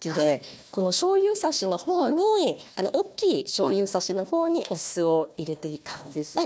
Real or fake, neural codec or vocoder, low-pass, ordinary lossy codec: fake; codec, 16 kHz, 1 kbps, FunCodec, trained on Chinese and English, 50 frames a second; none; none